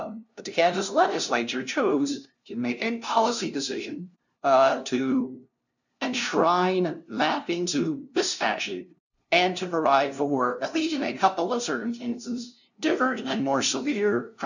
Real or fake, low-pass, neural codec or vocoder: fake; 7.2 kHz; codec, 16 kHz, 0.5 kbps, FunCodec, trained on LibriTTS, 25 frames a second